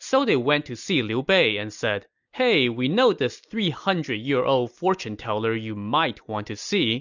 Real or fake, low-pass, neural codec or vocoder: real; 7.2 kHz; none